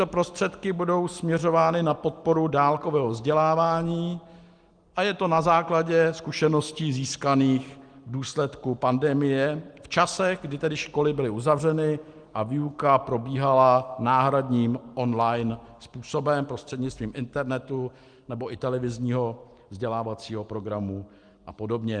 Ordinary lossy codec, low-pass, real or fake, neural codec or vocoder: Opus, 24 kbps; 9.9 kHz; real; none